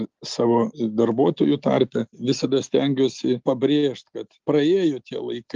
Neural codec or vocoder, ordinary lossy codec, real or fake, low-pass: none; Opus, 32 kbps; real; 7.2 kHz